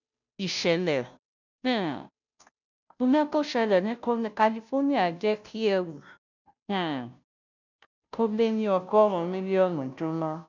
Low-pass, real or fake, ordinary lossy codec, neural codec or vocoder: 7.2 kHz; fake; none; codec, 16 kHz, 0.5 kbps, FunCodec, trained on Chinese and English, 25 frames a second